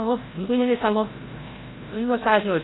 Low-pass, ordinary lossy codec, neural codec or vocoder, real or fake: 7.2 kHz; AAC, 16 kbps; codec, 16 kHz, 0.5 kbps, FreqCodec, larger model; fake